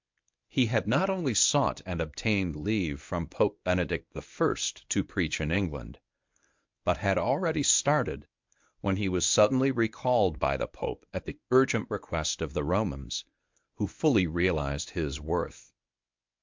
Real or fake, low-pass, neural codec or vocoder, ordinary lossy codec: fake; 7.2 kHz; codec, 24 kHz, 0.9 kbps, WavTokenizer, medium speech release version 1; MP3, 64 kbps